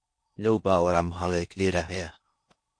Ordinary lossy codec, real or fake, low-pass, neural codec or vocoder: MP3, 48 kbps; fake; 9.9 kHz; codec, 16 kHz in and 24 kHz out, 0.6 kbps, FocalCodec, streaming, 4096 codes